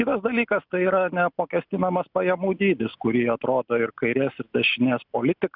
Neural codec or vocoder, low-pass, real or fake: none; 5.4 kHz; real